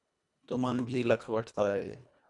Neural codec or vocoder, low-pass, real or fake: codec, 24 kHz, 1.5 kbps, HILCodec; 10.8 kHz; fake